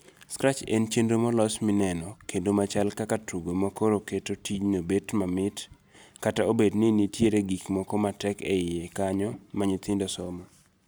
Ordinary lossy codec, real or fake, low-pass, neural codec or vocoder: none; real; none; none